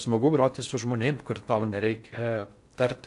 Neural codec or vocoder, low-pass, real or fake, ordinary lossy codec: codec, 16 kHz in and 24 kHz out, 0.6 kbps, FocalCodec, streaming, 4096 codes; 10.8 kHz; fake; AAC, 64 kbps